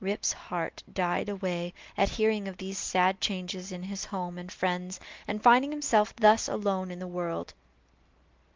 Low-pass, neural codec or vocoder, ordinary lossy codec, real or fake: 7.2 kHz; none; Opus, 24 kbps; real